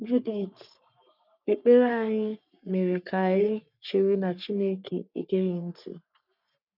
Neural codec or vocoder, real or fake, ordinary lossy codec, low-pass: codec, 44.1 kHz, 3.4 kbps, Pupu-Codec; fake; none; 5.4 kHz